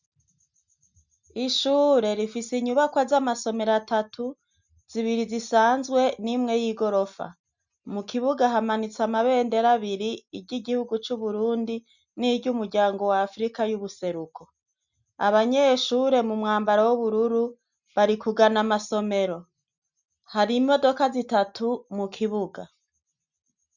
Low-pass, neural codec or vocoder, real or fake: 7.2 kHz; none; real